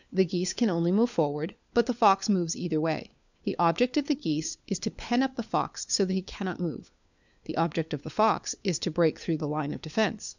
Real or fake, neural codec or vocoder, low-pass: fake; codec, 16 kHz, 4 kbps, FunCodec, trained on LibriTTS, 50 frames a second; 7.2 kHz